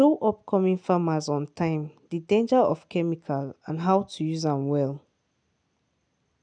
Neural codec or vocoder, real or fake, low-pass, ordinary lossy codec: none; real; 9.9 kHz; none